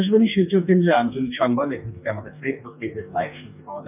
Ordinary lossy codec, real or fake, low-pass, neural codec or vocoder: none; fake; 3.6 kHz; codec, 44.1 kHz, 2.6 kbps, DAC